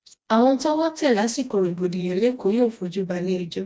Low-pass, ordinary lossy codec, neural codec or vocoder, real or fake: none; none; codec, 16 kHz, 1 kbps, FreqCodec, smaller model; fake